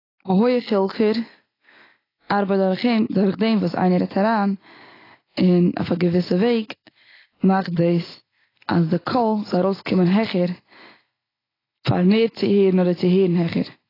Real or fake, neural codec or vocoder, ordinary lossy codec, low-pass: real; none; AAC, 24 kbps; 5.4 kHz